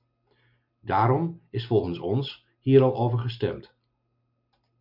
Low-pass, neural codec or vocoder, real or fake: 5.4 kHz; none; real